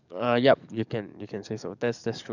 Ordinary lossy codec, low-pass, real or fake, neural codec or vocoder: none; 7.2 kHz; fake; codec, 44.1 kHz, 7.8 kbps, DAC